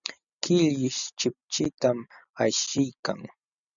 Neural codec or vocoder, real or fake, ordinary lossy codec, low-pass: none; real; MP3, 96 kbps; 7.2 kHz